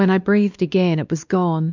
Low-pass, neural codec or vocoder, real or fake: 7.2 kHz; codec, 16 kHz, 2 kbps, X-Codec, WavLM features, trained on Multilingual LibriSpeech; fake